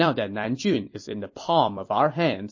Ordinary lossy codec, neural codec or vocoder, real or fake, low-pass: MP3, 32 kbps; vocoder, 44.1 kHz, 128 mel bands every 256 samples, BigVGAN v2; fake; 7.2 kHz